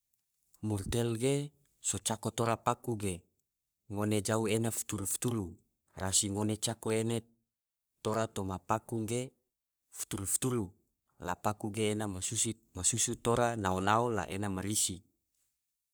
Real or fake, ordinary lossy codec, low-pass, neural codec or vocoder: fake; none; none; codec, 44.1 kHz, 3.4 kbps, Pupu-Codec